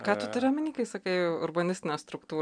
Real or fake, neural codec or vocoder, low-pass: real; none; 9.9 kHz